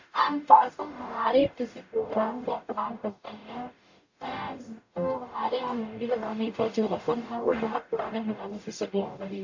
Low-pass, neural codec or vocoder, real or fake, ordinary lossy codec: 7.2 kHz; codec, 44.1 kHz, 0.9 kbps, DAC; fake; AAC, 48 kbps